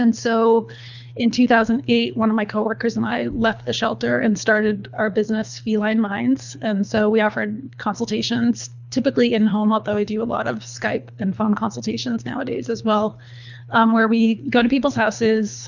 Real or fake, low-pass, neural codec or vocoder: fake; 7.2 kHz; codec, 24 kHz, 3 kbps, HILCodec